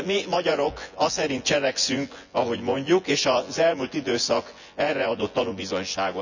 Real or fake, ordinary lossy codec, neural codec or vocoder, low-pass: fake; none; vocoder, 24 kHz, 100 mel bands, Vocos; 7.2 kHz